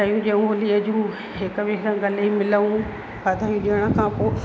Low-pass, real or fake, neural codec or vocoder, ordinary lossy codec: none; real; none; none